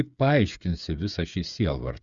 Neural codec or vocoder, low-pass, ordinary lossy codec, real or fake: codec, 16 kHz, 8 kbps, FreqCodec, smaller model; 7.2 kHz; Opus, 64 kbps; fake